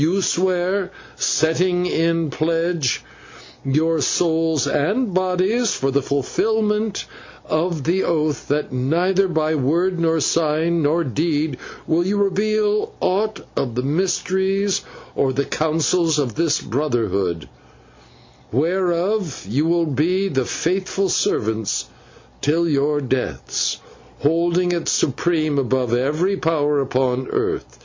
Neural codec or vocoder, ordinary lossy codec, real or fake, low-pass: none; MP3, 48 kbps; real; 7.2 kHz